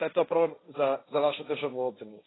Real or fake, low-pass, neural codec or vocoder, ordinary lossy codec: fake; 7.2 kHz; codec, 16 kHz, 1.1 kbps, Voila-Tokenizer; AAC, 16 kbps